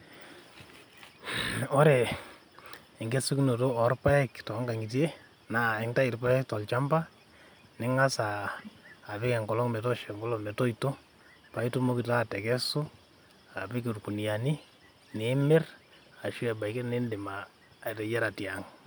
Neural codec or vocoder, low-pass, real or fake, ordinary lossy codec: none; none; real; none